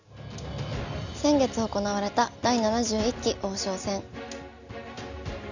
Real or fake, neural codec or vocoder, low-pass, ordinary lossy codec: real; none; 7.2 kHz; none